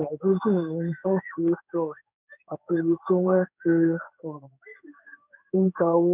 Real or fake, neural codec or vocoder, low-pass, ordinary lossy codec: fake; codec, 16 kHz in and 24 kHz out, 1 kbps, XY-Tokenizer; 3.6 kHz; none